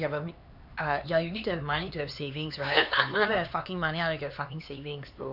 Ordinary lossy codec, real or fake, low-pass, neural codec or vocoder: none; fake; 5.4 kHz; codec, 16 kHz, 4 kbps, X-Codec, HuBERT features, trained on LibriSpeech